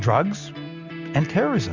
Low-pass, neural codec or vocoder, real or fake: 7.2 kHz; none; real